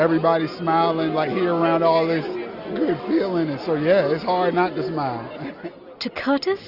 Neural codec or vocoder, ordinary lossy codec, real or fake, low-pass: none; AAC, 48 kbps; real; 5.4 kHz